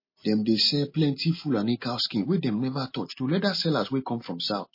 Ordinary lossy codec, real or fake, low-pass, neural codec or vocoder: MP3, 24 kbps; real; 5.4 kHz; none